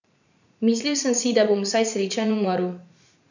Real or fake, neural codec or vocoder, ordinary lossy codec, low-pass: fake; vocoder, 44.1 kHz, 80 mel bands, Vocos; none; 7.2 kHz